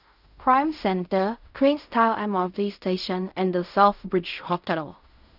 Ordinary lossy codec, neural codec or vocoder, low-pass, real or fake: none; codec, 16 kHz in and 24 kHz out, 0.4 kbps, LongCat-Audio-Codec, fine tuned four codebook decoder; 5.4 kHz; fake